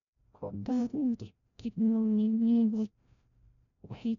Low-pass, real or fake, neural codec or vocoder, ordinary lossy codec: 7.2 kHz; fake; codec, 16 kHz, 0.5 kbps, FreqCodec, larger model; none